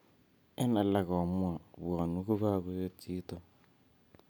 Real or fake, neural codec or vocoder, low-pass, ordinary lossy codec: real; none; none; none